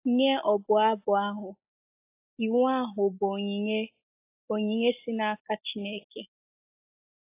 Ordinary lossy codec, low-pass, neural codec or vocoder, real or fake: AAC, 24 kbps; 3.6 kHz; none; real